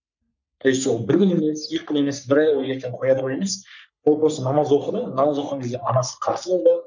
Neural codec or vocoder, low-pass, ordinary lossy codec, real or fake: codec, 44.1 kHz, 3.4 kbps, Pupu-Codec; 7.2 kHz; none; fake